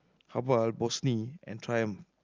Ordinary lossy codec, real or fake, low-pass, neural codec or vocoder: Opus, 24 kbps; real; 7.2 kHz; none